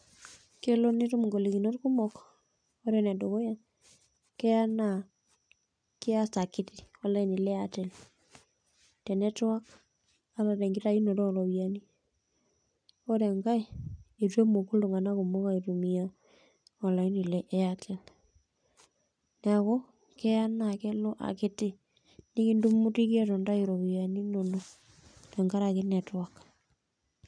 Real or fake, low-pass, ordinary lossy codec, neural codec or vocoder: real; 9.9 kHz; none; none